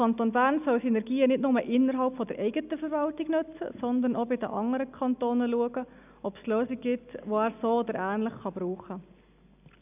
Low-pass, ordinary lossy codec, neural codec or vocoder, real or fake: 3.6 kHz; none; none; real